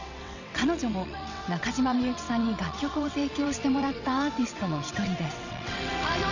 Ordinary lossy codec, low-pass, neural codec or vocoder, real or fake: none; 7.2 kHz; vocoder, 22.05 kHz, 80 mel bands, WaveNeXt; fake